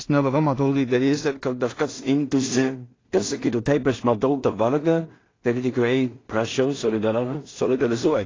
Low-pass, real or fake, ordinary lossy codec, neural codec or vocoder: 7.2 kHz; fake; AAC, 32 kbps; codec, 16 kHz in and 24 kHz out, 0.4 kbps, LongCat-Audio-Codec, two codebook decoder